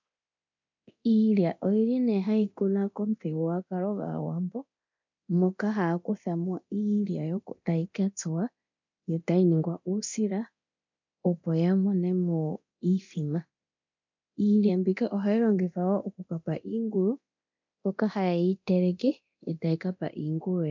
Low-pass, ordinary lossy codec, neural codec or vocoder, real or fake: 7.2 kHz; MP3, 64 kbps; codec, 24 kHz, 0.9 kbps, DualCodec; fake